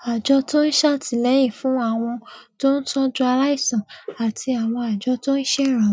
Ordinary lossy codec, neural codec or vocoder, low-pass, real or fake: none; none; none; real